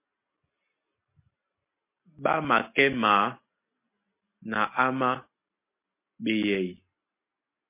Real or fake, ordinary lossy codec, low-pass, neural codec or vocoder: real; MP3, 24 kbps; 3.6 kHz; none